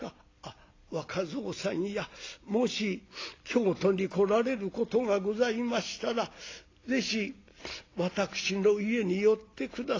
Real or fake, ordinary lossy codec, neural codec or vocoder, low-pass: real; AAC, 32 kbps; none; 7.2 kHz